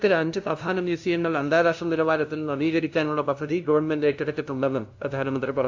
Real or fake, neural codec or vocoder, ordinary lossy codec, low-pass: fake; codec, 16 kHz, 0.5 kbps, FunCodec, trained on LibriTTS, 25 frames a second; AAC, 48 kbps; 7.2 kHz